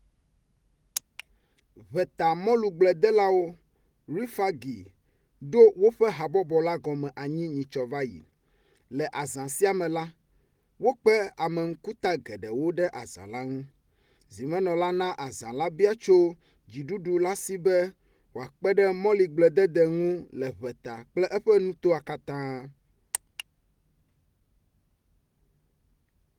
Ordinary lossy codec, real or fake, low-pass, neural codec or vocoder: Opus, 24 kbps; real; 19.8 kHz; none